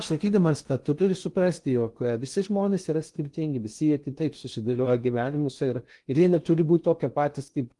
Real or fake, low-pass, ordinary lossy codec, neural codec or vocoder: fake; 10.8 kHz; Opus, 24 kbps; codec, 16 kHz in and 24 kHz out, 0.6 kbps, FocalCodec, streaming, 2048 codes